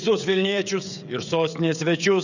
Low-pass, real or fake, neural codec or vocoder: 7.2 kHz; fake; codec, 16 kHz, 16 kbps, FreqCodec, smaller model